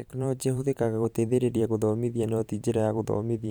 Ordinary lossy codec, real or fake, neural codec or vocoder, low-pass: none; fake; vocoder, 44.1 kHz, 128 mel bands every 512 samples, BigVGAN v2; none